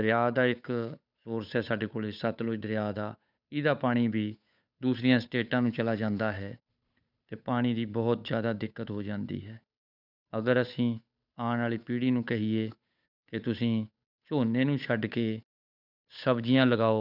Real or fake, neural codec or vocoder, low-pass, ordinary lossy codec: fake; codec, 16 kHz, 8 kbps, FunCodec, trained on Chinese and English, 25 frames a second; 5.4 kHz; none